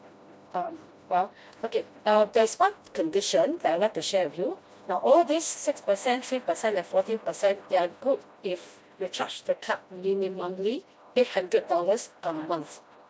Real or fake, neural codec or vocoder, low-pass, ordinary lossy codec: fake; codec, 16 kHz, 1 kbps, FreqCodec, smaller model; none; none